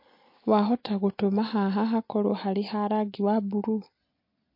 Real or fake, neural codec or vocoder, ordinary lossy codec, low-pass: real; none; MP3, 32 kbps; 5.4 kHz